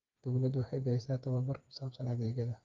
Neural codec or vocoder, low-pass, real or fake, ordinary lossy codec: codec, 16 kHz, 4 kbps, FreqCodec, smaller model; 7.2 kHz; fake; Opus, 32 kbps